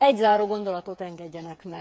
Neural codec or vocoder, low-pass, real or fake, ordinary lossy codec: codec, 16 kHz, 8 kbps, FreqCodec, smaller model; none; fake; none